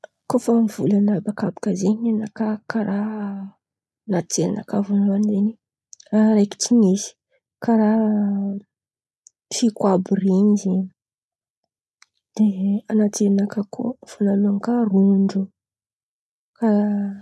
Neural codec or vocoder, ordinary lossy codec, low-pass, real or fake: none; none; none; real